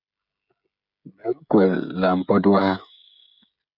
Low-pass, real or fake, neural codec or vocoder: 5.4 kHz; fake; codec, 16 kHz, 16 kbps, FreqCodec, smaller model